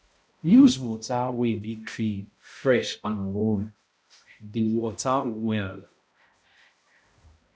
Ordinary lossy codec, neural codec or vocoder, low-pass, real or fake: none; codec, 16 kHz, 0.5 kbps, X-Codec, HuBERT features, trained on balanced general audio; none; fake